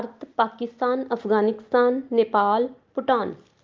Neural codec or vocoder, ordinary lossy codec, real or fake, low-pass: none; Opus, 32 kbps; real; 7.2 kHz